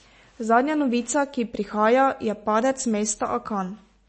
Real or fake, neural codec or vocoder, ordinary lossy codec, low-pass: real; none; MP3, 32 kbps; 10.8 kHz